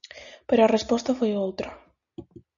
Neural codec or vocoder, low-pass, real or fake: none; 7.2 kHz; real